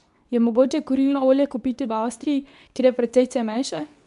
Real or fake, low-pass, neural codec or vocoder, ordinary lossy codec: fake; 10.8 kHz; codec, 24 kHz, 0.9 kbps, WavTokenizer, medium speech release version 2; none